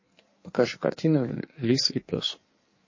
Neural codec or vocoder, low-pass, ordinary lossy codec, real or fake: codec, 16 kHz in and 24 kHz out, 1.1 kbps, FireRedTTS-2 codec; 7.2 kHz; MP3, 32 kbps; fake